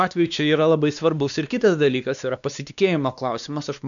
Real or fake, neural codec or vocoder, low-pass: fake; codec, 16 kHz, 2 kbps, X-Codec, WavLM features, trained on Multilingual LibriSpeech; 7.2 kHz